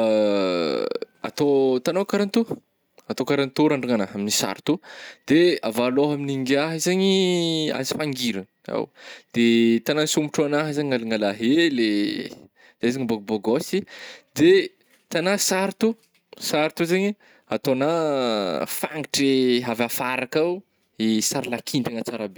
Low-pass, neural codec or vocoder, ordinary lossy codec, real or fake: none; none; none; real